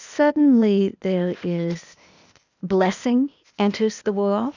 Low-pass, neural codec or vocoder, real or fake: 7.2 kHz; codec, 16 kHz, 0.8 kbps, ZipCodec; fake